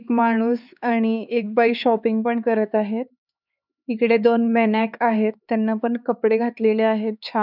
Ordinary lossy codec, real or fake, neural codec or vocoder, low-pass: none; fake; codec, 16 kHz, 4 kbps, X-Codec, HuBERT features, trained on LibriSpeech; 5.4 kHz